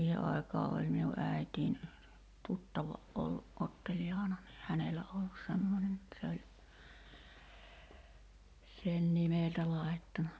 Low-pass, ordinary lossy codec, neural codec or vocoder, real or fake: none; none; none; real